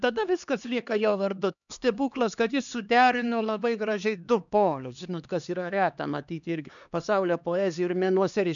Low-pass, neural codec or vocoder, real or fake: 7.2 kHz; codec, 16 kHz, 2 kbps, X-Codec, HuBERT features, trained on LibriSpeech; fake